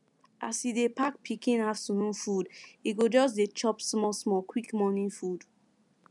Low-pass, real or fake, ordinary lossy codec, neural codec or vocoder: 10.8 kHz; real; none; none